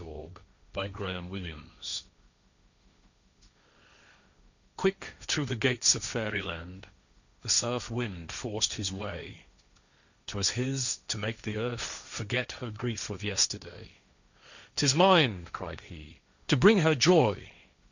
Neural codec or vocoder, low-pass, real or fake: codec, 16 kHz, 1.1 kbps, Voila-Tokenizer; 7.2 kHz; fake